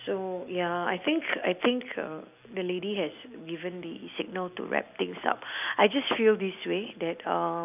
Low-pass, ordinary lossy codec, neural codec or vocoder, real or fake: 3.6 kHz; none; none; real